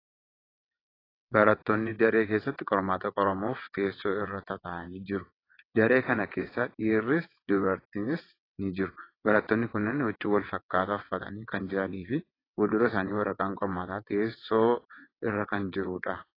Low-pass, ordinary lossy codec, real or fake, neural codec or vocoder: 5.4 kHz; AAC, 24 kbps; fake; vocoder, 22.05 kHz, 80 mel bands, WaveNeXt